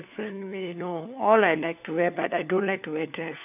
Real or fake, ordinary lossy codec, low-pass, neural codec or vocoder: fake; none; 3.6 kHz; codec, 16 kHz, 4 kbps, FunCodec, trained on LibriTTS, 50 frames a second